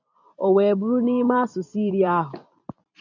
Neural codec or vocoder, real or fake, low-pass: vocoder, 44.1 kHz, 128 mel bands every 256 samples, BigVGAN v2; fake; 7.2 kHz